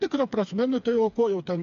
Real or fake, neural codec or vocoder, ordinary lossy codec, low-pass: fake; codec, 16 kHz, 4 kbps, FreqCodec, smaller model; MP3, 64 kbps; 7.2 kHz